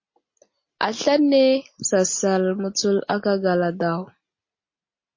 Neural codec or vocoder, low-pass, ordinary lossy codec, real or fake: none; 7.2 kHz; MP3, 32 kbps; real